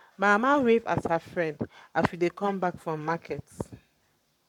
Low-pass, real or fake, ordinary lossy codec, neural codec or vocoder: 19.8 kHz; fake; none; codec, 44.1 kHz, 7.8 kbps, Pupu-Codec